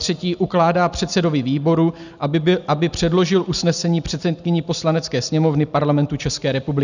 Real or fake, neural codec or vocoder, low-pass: real; none; 7.2 kHz